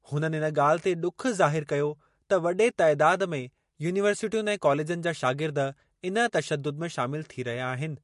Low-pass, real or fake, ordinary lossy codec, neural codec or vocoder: 14.4 kHz; real; MP3, 48 kbps; none